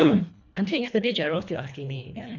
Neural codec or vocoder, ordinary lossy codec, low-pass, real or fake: codec, 24 kHz, 1.5 kbps, HILCodec; none; 7.2 kHz; fake